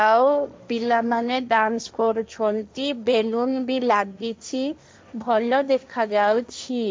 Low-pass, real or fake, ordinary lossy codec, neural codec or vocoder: none; fake; none; codec, 16 kHz, 1.1 kbps, Voila-Tokenizer